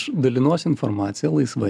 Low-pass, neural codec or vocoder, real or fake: 9.9 kHz; none; real